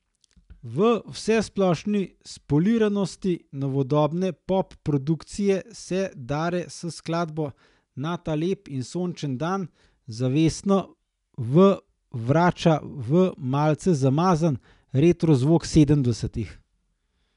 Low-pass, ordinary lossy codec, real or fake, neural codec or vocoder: 10.8 kHz; none; real; none